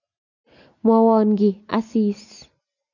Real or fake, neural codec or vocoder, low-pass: real; none; 7.2 kHz